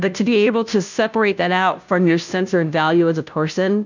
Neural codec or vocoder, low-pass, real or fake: codec, 16 kHz, 0.5 kbps, FunCodec, trained on Chinese and English, 25 frames a second; 7.2 kHz; fake